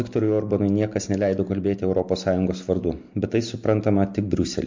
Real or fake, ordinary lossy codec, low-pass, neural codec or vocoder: real; MP3, 48 kbps; 7.2 kHz; none